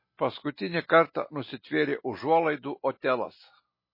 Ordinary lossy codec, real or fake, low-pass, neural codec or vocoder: MP3, 24 kbps; real; 5.4 kHz; none